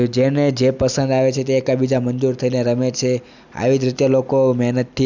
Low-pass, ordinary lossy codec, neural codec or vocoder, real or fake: 7.2 kHz; none; none; real